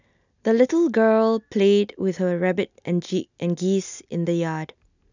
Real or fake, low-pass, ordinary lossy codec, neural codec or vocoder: real; 7.2 kHz; none; none